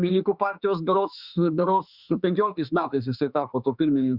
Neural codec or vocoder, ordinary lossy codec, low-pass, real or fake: autoencoder, 48 kHz, 32 numbers a frame, DAC-VAE, trained on Japanese speech; Opus, 64 kbps; 5.4 kHz; fake